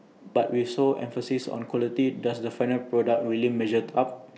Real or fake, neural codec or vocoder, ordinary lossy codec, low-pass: real; none; none; none